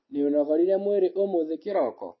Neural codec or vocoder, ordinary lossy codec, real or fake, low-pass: none; MP3, 24 kbps; real; 7.2 kHz